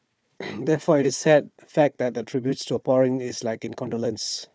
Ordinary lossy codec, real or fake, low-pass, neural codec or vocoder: none; fake; none; codec, 16 kHz, 4 kbps, FunCodec, trained on Chinese and English, 50 frames a second